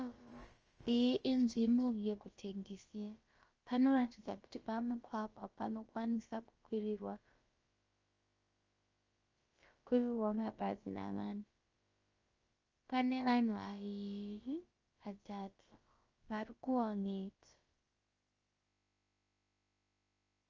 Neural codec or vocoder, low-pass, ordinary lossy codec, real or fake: codec, 16 kHz, about 1 kbps, DyCAST, with the encoder's durations; 7.2 kHz; Opus, 24 kbps; fake